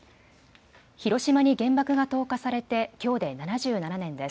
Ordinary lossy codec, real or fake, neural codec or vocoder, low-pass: none; real; none; none